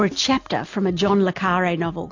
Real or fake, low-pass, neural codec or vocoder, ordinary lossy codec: real; 7.2 kHz; none; AAC, 48 kbps